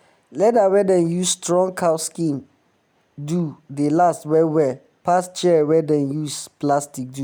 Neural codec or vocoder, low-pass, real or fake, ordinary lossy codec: none; none; real; none